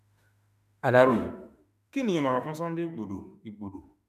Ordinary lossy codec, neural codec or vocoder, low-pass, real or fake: none; autoencoder, 48 kHz, 32 numbers a frame, DAC-VAE, trained on Japanese speech; 14.4 kHz; fake